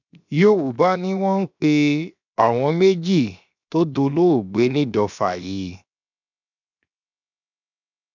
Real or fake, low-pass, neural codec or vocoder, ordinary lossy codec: fake; 7.2 kHz; codec, 16 kHz, 0.7 kbps, FocalCodec; none